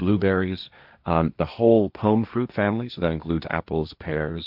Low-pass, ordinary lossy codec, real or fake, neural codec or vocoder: 5.4 kHz; AAC, 48 kbps; fake; codec, 16 kHz, 1.1 kbps, Voila-Tokenizer